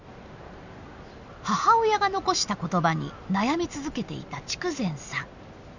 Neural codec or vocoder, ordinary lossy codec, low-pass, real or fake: none; none; 7.2 kHz; real